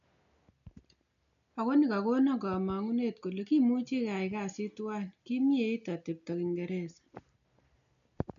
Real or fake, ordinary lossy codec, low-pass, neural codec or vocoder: real; none; 7.2 kHz; none